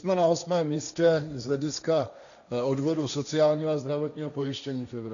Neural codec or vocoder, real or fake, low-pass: codec, 16 kHz, 1.1 kbps, Voila-Tokenizer; fake; 7.2 kHz